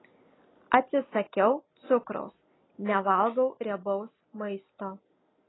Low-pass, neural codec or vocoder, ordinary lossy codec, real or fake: 7.2 kHz; none; AAC, 16 kbps; real